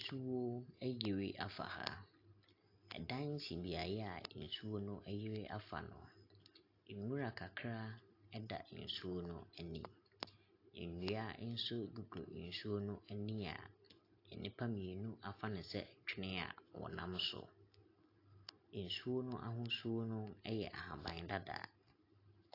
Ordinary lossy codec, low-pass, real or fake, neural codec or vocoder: AAC, 32 kbps; 5.4 kHz; real; none